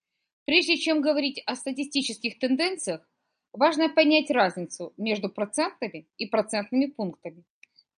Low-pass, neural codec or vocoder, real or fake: 9.9 kHz; none; real